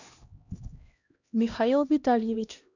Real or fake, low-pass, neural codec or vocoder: fake; 7.2 kHz; codec, 16 kHz, 1 kbps, X-Codec, HuBERT features, trained on LibriSpeech